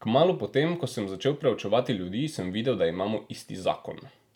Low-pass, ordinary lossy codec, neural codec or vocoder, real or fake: 19.8 kHz; none; none; real